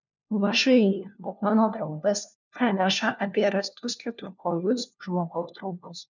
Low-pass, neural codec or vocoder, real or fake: 7.2 kHz; codec, 16 kHz, 1 kbps, FunCodec, trained on LibriTTS, 50 frames a second; fake